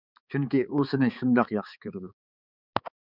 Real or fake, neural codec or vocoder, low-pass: fake; codec, 16 kHz, 4 kbps, X-Codec, HuBERT features, trained on balanced general audio; 5.4 kHz